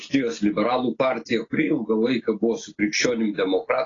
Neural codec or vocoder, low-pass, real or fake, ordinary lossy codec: none; 7.2 kHz; real; AAC, 32 kbps